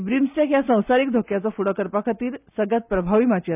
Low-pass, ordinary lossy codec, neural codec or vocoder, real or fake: 3.6 kHz; none; none; real